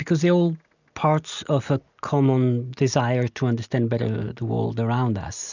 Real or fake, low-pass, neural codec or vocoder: real; 7.2 kHz; none